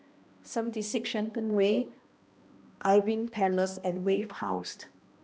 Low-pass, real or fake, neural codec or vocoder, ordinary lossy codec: none; fake; codec, 16 kHz, 1 kbps, X-Codec, HuBERT features, trained on balanced general audio; none